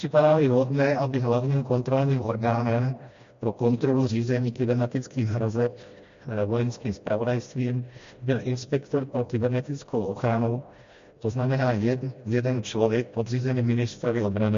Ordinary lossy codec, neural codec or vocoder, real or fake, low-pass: MP3, 48 kbps; codec, 16 kHz, 1 kbps, FreqCodec, smaller model; fake; 7.2 kHz